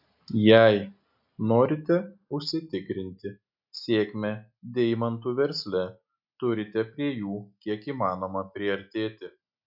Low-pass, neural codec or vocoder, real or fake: 5.4 kHz; none; real